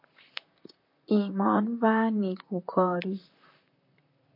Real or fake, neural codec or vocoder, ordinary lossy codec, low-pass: fake; vocoder, 44.1 kHz, 128 mel bands, Pupu-Vocoder; MP3, 32 kbps; 5.4 kHz